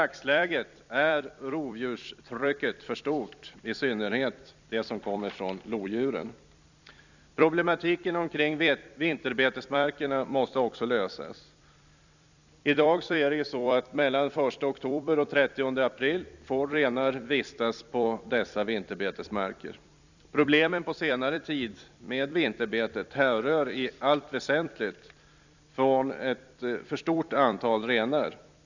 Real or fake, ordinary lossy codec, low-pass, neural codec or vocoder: fake; none; 7.2 kHz; vocoder, 44.1 kHz, 128 mel bands every 256 samples, BigVGAN v2